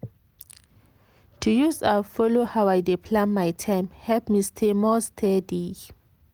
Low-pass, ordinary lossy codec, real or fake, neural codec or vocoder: 19.8 kHz; Opus, 32 kbps; real; none